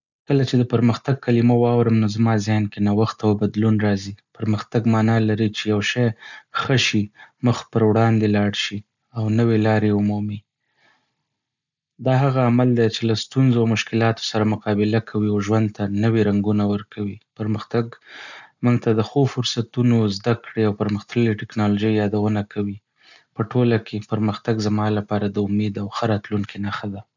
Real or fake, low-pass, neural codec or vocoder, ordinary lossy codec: real; 7.2 kHz; none; none